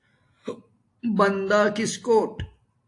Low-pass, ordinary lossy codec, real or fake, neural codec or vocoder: 10.8 kHz; AAC, 48 kbps; real; none